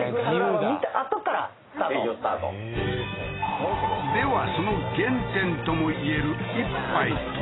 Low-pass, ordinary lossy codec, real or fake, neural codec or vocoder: 7.2 kHz; AAC, 16 kbps; real; none